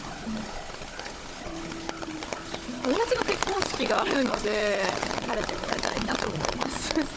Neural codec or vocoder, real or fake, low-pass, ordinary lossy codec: codec, 16 kHz, 16 kbps, FunCodec, trained on Chinese and English, 50 frames a second; fake; none; none